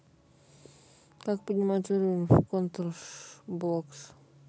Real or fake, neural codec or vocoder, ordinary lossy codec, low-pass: real; none; none; none